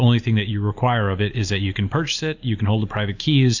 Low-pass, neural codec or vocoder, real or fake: 7.2 kHz; none; real